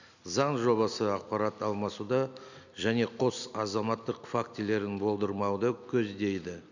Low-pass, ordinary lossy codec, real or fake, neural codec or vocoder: 7.2 kHz; none; real; none